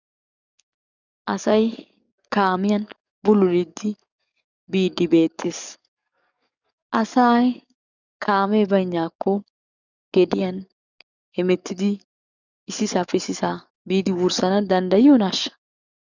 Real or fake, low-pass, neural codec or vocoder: fake; 7.2 kHz; codec, 44.1 kHz, 7.8 kbps, DAC